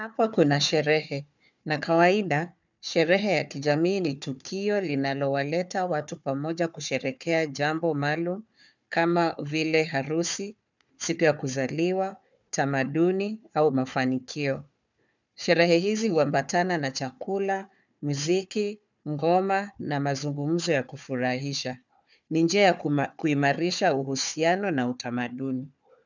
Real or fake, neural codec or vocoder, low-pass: fake; codec, 16 kHz, 4 kbps, FunCodec, trained on Chinese and English, 50 frames a second; 7.2 kHz